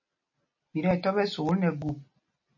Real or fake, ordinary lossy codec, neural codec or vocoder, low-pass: real; MP3, 32 kbps; none; 7.2 kHz